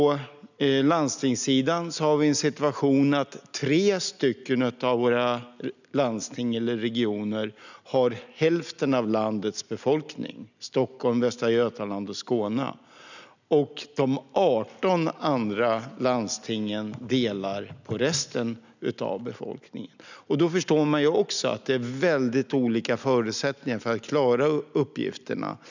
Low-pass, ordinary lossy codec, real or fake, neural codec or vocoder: 7.2 kHz; none; real; none